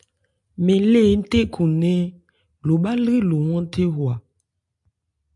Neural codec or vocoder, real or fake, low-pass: none; real; 10.8 kHz